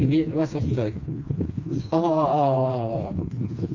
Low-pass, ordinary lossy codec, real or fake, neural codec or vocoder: 7.2 kHz; AAC, 48 kbps; fake; codec, 16 kHz, 2 kbps, FreqCodec, smaller model